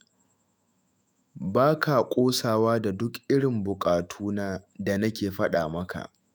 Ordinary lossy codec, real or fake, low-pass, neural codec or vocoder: none; fake; none; autoencoder, 48 kHz, 128 numbers a frame, DAC-VAE, trained on Japanese speech